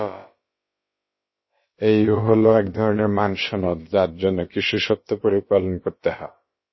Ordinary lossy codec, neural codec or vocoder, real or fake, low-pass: MP3, 24 kbps; codec, 16 kHz, about 1 kbps, DyCAST, with the encoder's durations; fake; 7.2 kHz